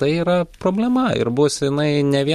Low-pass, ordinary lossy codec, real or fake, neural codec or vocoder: 14.4 kHz; MP3, 64 kbps; real; none